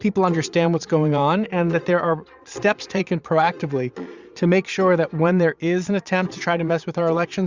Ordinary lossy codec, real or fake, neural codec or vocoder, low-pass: Opus, 64 kbps; fake; vocoder, 22.05 kHz, 80 mel bands, Vocos; 7.2 kHz